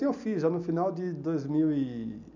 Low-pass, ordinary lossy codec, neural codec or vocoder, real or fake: 7.2 kHz; none; none; real